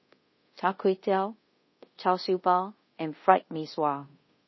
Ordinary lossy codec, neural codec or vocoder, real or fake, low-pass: MP3, 24 kbps; codec, 24 kHz, 0.5 kbps, DualCodec; fake; 7.2 kHz